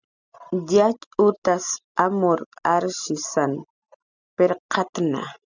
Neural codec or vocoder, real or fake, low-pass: none; real; 7.2 kHz